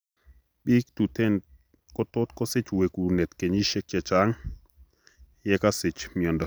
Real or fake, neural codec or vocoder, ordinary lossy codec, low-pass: real; none; none; none